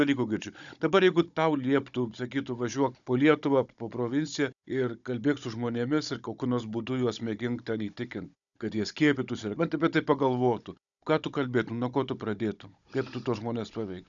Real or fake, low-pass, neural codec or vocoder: fake; 7.2 kHz; codec, 16 kHz, 16 kbps, FunCodec, trained on Chinese and English, 50 frames a second